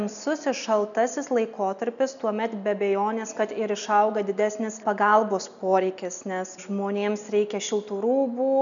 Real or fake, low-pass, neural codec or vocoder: real; 7.2 kHz; none